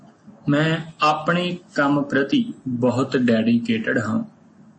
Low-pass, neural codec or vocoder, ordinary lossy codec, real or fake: 9.9 kHz; none; MP3, 32 kbps; real